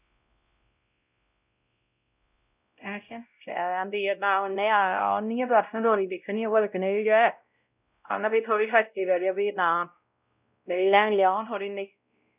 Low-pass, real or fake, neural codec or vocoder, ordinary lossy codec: 3.6 kHz; fake; codec, 16 kHz, 0.5 kbps, X-Codec, WavLM features, trained on Multilingual LibriSpeech; none